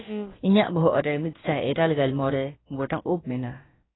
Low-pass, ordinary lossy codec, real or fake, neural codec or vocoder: 7.2 kHz; AAC, 16 kbps; fake; codec, 16 kHz, about 1 kbps, DyCAST, with the encoder's durations